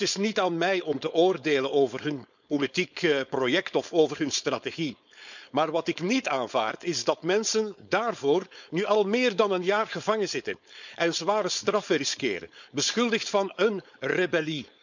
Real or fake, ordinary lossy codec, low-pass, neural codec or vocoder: fake; none; 7.2 kHz; codec, 16 kHz, 4.8 kbps, FACodec